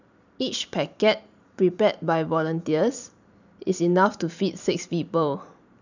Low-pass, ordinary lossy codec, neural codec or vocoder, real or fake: 7.2 kHz; none; vocoder, 44.1 kHz, 128 mel bands every 512 samples, BigVGAN v2; fake